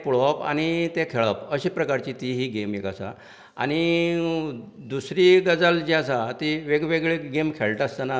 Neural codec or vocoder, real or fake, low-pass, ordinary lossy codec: none; real; none; none